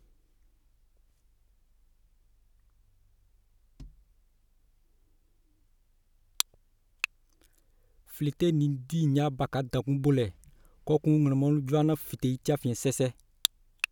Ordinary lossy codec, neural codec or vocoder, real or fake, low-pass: none; none; real; 19.8 kHz